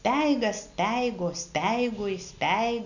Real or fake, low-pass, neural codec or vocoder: real; 7.2 kHz; none